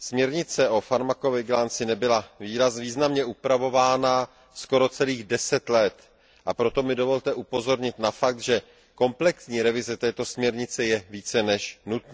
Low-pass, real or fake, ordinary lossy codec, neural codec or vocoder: none; real; none; none